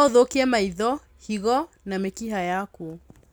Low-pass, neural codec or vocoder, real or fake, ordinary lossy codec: none; none; real; none